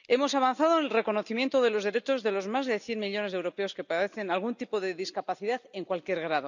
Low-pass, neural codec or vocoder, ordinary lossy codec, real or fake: 7.2 kHz; none; none; real